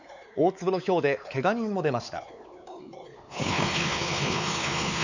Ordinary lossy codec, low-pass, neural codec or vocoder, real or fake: none; 7.2 kHz; codec, 16 kHz, 4 kbps, X-Codec, WavLM features, trained on Multilingual LibriSpeech; fake